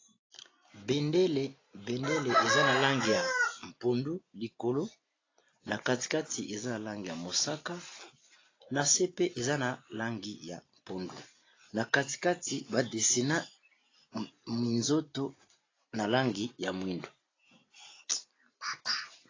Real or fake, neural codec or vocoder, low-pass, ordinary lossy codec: real; none; 7.2 kHz; AAC, 32 kbps